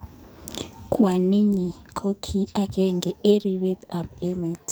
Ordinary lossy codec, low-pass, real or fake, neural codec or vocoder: none; none; fake; codec, 44.1 kHz, 2.6 kbps, SNAC